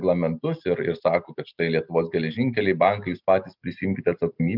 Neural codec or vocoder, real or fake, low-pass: none; real; 5.4 kHz